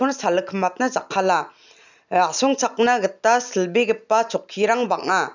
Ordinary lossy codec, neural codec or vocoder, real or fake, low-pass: none; none; real; 7.2 kHz